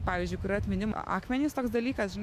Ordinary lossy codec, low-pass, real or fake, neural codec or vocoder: MP3, 96 kbps; 14.4 kHz; fake; autoencoder, 48 kHz, 128 numbers a frame, DAC-VAE, trained on Japanese speech